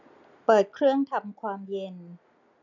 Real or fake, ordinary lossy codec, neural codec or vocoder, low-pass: real; none; none; 7.2 kHz